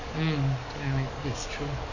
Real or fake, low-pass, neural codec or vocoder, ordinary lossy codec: fake; 7.2 kHz; codec, 44.1 kHz, 7.8 kbps, DAC; none